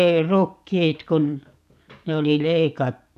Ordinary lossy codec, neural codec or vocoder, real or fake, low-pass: none; codec, 44.1 kHz, 7.8 kbps, DAC; fake; 14.4 kHz